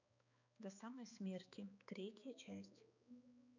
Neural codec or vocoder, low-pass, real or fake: codec, 16 kHz, 4 kbps, X-Codec, HuBERT features, trained on balanced general audio; 7.2 kHz; fake